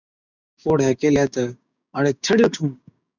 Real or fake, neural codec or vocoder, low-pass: fake; codec, 16 kHz, 6 kbps, DAC; 7.2 kHz